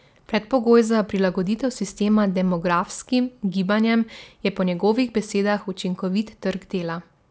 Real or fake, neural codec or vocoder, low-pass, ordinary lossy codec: real; none; none; none